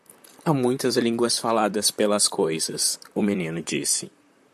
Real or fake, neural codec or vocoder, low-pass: fake; vocoder, 44.1 kHz, 128 mel bands, Pupu-Vocoder; 14.4 kHz